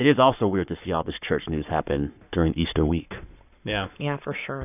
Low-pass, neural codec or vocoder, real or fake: 3.6 kHz; codec, 44.1 kHz, 7.8 kbps, Pupu-Codec; fake